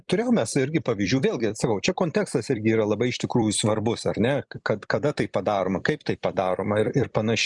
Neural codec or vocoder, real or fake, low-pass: vocoder, 44.1 kHz, 128 mel bands every 256 samples, BigVGAN v2; fake; 10.8 kHz